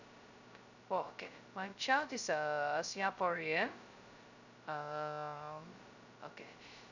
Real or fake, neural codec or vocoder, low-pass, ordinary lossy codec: fake; codec, 16 kHz, 0.2 kbps, FocalCodec; 7.2 kHz; none